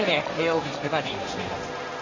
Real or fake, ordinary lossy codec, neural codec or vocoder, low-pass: fake; none; codec, 16 kHz, 1.1 kbps, Voila-Tokenizer; 7.2 kHz